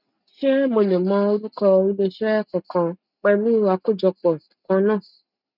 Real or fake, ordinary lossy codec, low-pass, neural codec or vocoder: real; none; 5.4 kHz; none